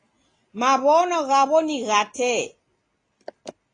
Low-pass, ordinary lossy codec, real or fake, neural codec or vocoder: 9.9 kHz; AAC, 32 kbps; real; none